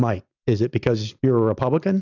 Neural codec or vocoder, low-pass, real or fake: none; 7.2 kHz; real